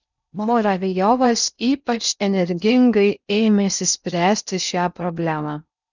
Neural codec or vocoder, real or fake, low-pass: codec, 16 kHz in and 24 kHz out, 0.6 kbps, FocalCodec, streaming, 4096 codes; fake; 7.2 kHz